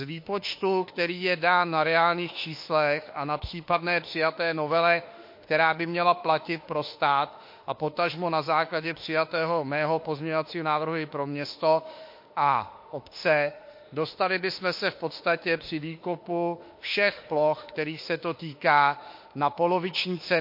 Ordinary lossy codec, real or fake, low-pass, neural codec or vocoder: MP3, 32 kbps; fake; 5.4 kHz; autoencoder, 48 kHz, 32 numbers a frame, DAC-VAE, trained on Japanese speech